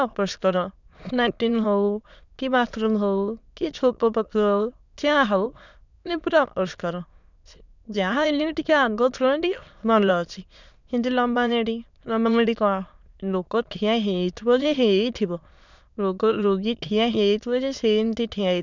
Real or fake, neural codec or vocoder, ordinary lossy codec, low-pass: fake; autoencoder, 22.05 kHz, a latent of 192 numbers a frame, VITS, trained on many speakers; none; 7.2 kHz